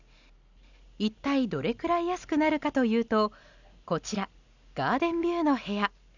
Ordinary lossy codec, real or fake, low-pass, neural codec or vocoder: none; real; 7.2 kHz; none